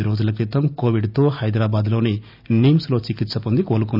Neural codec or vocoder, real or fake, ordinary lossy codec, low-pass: none; real; none; 5.4 kHz